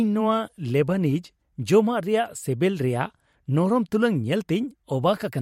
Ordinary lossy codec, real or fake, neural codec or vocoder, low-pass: MP3, 64 kbps; fake; vocoder, 44.1 kHz, 128 mel bands every 512 samples, BigVGAN v2; 19.8 kHz